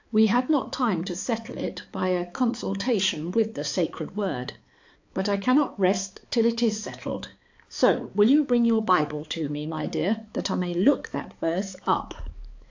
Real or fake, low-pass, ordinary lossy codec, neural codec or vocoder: fake; 7.2 kHz; AAC, 48 kbps; codec, 16 kHz, 4 kbps, X-Codec, HuBERT features, trained on balanced general audio